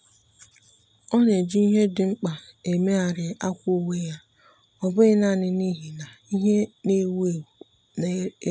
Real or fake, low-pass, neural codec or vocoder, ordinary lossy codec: real; none; none; none